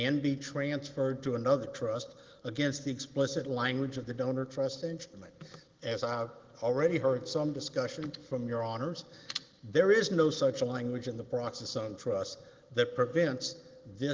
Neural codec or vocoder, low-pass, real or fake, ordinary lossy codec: none; 7.2 kHz; real; Opus, 16 kbps